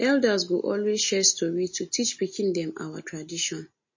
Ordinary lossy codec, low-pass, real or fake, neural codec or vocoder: MP3, 32 kbps; 7.2 kHz; real; none